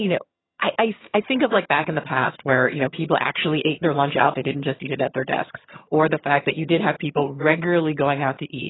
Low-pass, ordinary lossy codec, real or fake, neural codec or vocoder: 7.2 kHz; AAC, 16 kbps; fake; vocoder, 22.05 kHz, 80 mel bands, HiFi-GAN